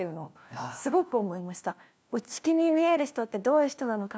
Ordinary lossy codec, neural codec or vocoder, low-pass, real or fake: none; codec, 16 kHz, 1 kbps, FunCodec, trained on LibriTTS, 50 frames a second; none; fake